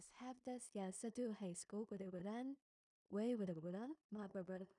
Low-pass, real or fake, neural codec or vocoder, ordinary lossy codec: 10.8 kHz; fake; codec, 16 kHz in and 24 kHz out, 0.4 kbps, LongCat-Audio-Codec, two codebook decoder; none